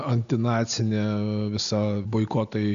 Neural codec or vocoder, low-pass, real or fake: none; 7.2 kHz; real